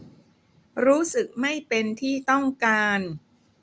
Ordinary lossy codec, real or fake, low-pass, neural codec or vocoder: none; real; none; none